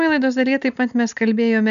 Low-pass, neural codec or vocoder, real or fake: 7.2 kHz; none; real